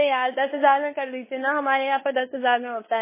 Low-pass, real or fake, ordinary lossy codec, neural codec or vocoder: 3.6 kHz; fake; MP3, 16 kbps; codec, 16 kHz in and 24 kHz out, 0.9 kbps, LongCat-Audio-Codec, fine tuned four codebook decoder